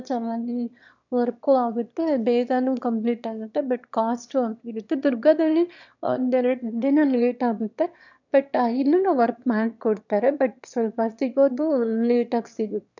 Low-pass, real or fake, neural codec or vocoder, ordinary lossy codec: 7.2 kHz; fake; autoencoder, 22.05 kHz, a latent of 192 numbers a frame, VITS, trained on one speaker; none